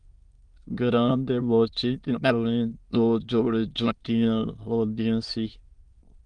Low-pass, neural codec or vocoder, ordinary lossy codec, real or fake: 9.9 kHz; autoencoder, 22.05 kHz, a latent of 192 numbers a frame, VITS, trained on many speakers; Opus, 24 kbps; fake